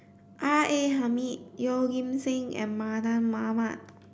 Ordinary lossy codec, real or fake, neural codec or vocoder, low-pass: none; real; none; none